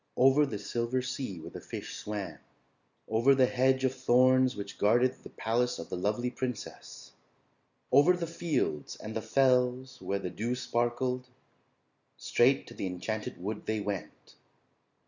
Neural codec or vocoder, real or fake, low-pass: none; real; 7.2 kHz